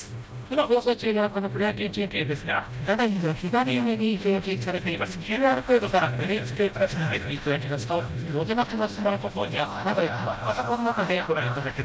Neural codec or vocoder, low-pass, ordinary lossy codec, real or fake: codec, 16 kHz, 0.5 kbps, FreqCodec, smaller model; none; none; fake